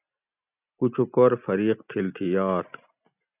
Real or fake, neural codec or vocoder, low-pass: real; none; 3.6 kHz